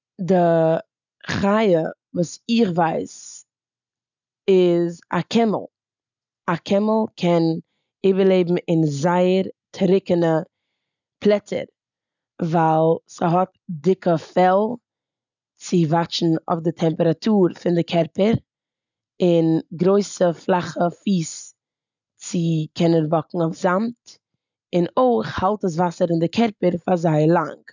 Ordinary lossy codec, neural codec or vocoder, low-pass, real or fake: none; none; 7.2 kHz; real